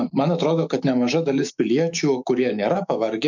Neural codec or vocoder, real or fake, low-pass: autoencoder, 48 kHz, 128 numbers a frame, DAC-VAE, trained on Japanese speech; fake; 7.2 kHz